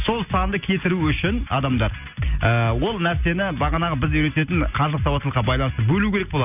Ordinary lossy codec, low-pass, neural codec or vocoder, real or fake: none; 3.6 kHz; none; real